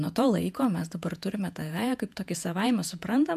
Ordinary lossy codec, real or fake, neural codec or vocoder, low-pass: AAC, 96 kbps; fake; vocoder, 48 kHz, 128 mel bands, Vocos; 14.4 kHz